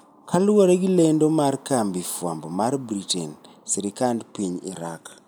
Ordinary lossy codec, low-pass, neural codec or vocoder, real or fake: none; none; none; real